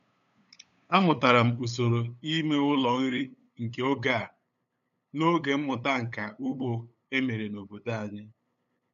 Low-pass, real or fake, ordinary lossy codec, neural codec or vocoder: 7.2 kHz; fake; none; codec, 16 kHz, 8 kbps, FunCodec, trained on LibriTTS, 25 frames a second